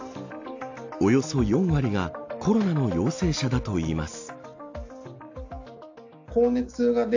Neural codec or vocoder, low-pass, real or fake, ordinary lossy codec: none; 7.2 kHz; real; AAC, 48 kbps